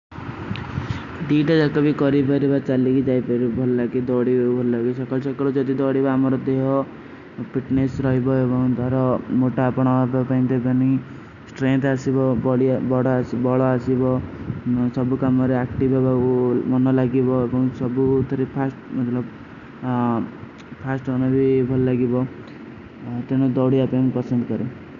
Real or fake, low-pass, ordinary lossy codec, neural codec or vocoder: real; 7.2 kHz; none; none